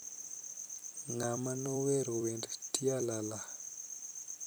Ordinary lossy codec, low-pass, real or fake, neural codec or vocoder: none; none; fake; vocoder, 44.1 kHz, 128 mel bands every 256 samples, BigVGAN v2